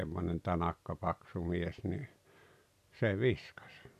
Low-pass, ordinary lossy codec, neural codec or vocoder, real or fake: 14.4 kHz; none; none; real